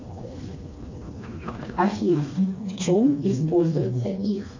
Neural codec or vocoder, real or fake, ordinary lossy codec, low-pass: codec, 16 kHz, 2 kbps, FreqCodec, smaller model; fake; AAC, 48 kbps; 7.2 kHz